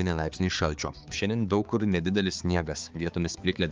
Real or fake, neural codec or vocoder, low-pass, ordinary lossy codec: fake; codec, 16 kHz, 4 kbps, X-Codec, HuBERT features, trained on balanced general audio; 7.2 kHz; Opus, 24 kbps